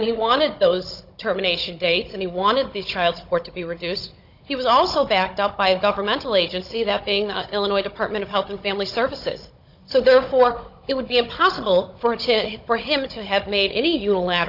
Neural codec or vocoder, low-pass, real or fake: codec, 16 kHz, 16 kbps, FunCodec, trained on Chinese and English, 50 frames a second; 5.4 kHz; fake